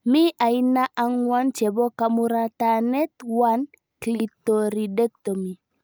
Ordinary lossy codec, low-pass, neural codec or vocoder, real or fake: none; none; none; real